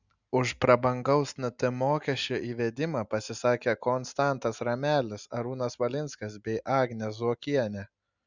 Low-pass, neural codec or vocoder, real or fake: 7.2 kHz; none; real